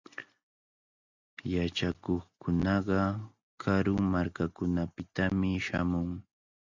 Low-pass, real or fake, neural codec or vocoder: 7.2 kHz; real; none